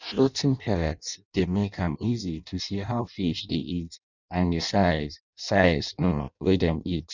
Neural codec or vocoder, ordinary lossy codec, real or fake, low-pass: codec, 16 kHz in and 24 kHz out, 0.6 kbps, FireRedTTS-2 codec; none; fake; 7.2 kHz